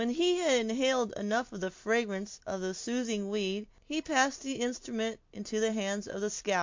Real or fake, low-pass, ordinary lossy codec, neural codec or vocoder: real; 7.2 kHz; MP3, 48 kbps; none